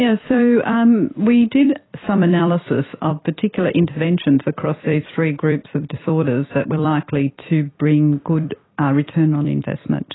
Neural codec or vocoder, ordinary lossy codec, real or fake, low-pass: vocoder, 44.1 kHz, 128 mel bands every 256 samples, BigVGAN v2; AAC, 16 kbps; fake; 7.2 kHz